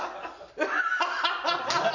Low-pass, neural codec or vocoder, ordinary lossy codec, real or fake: 7.2 kHz; none; none; real